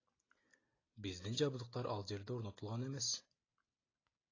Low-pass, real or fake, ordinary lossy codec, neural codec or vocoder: 7.2 kHz; real; AAC, 32 kbps; none